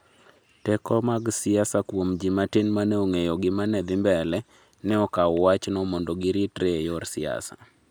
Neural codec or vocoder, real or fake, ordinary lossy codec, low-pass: none; real; none; none